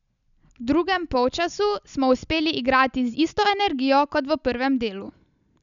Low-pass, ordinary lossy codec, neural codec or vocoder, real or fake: 7.2 kHz; none; none; real